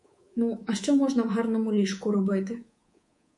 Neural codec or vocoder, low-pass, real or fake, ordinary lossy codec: codec, 24 kHz, 3.1 kbps, DualCodec; 10.8 kHz; fake; MP3, 48 kbps